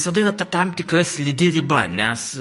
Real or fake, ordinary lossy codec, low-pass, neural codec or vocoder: fake; MP3, 48 kbps; 14.4 kHz; codec, 44.1 kHz, 2.6 kbps, SNAC